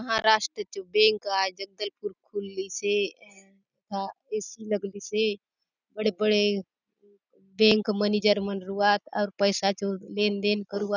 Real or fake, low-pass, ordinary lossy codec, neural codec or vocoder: real; 7.2 kHz; none; none